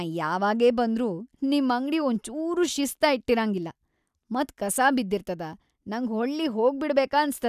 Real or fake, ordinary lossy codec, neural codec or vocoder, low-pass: real; none; none; 14.4 kHz